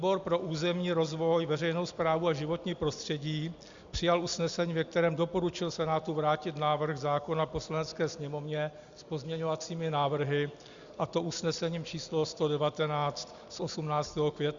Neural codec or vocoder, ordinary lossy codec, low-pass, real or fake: none; Opus, 64 kbps; 7.2 kHz; real